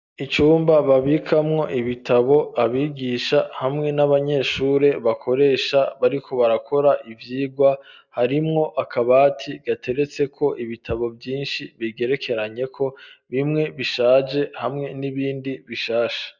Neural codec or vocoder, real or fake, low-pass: none; real; 7.2 kHz